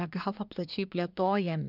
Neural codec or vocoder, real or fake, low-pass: codec, 24 kHz, 1 kbps, SNAC; fake; 5.4 kHz